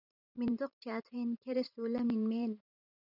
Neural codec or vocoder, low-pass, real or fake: vocoder, 44.1 kHz, 128 mel bands every 512 samples, BigVGAN v2; 5.4 kHz; fake